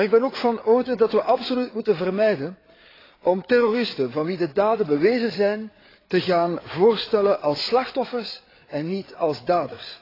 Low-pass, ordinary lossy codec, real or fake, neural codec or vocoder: 5.4 kHz; AAC, 24 kbps; fake; codec, 16 kHz, 8 kbps, FreqCodec, larger model